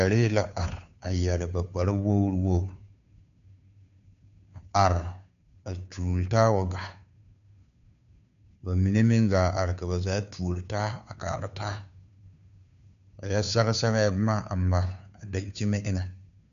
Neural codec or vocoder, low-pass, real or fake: codec, 16 kHz, 2 kbps, FunCodec, trained on Chinese and English, 25 frames a second; 7.2 kHz; fake